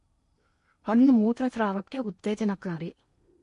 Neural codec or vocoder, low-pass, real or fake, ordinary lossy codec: codec, 16 kHz in and 24 kHz out, 0.8 kbps, FocalCodec, streaming, 65536 codes; 10.8 kHz; fake; MP3, 48 kbps